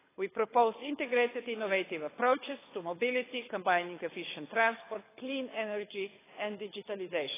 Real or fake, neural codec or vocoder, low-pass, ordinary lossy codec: fake; codec, 24 kHz, 6 kbps, HILCodec; 3.6 kHz; AAC, 16 kbps